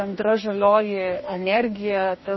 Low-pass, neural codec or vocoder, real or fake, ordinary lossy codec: 7.2 kHz; codec, 44.1 kHz, 2.6 kbps, DAC; fake; MP3, 24 kbps